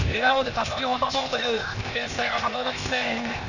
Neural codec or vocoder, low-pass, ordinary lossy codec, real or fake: codec, 16 kHz, 0.8 kbps, ZipCodec; 7.2 kHz; none; fake